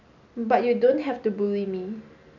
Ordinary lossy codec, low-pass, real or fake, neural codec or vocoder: none; 7.2 kHz; real; none